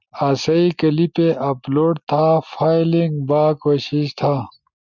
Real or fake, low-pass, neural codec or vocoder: real; 7.2 kHz; none